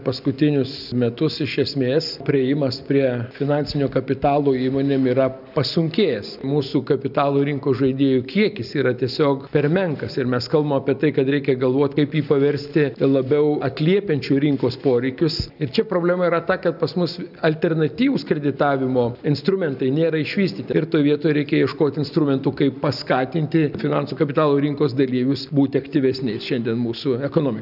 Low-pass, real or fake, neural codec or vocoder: 5.4 kHz; real; none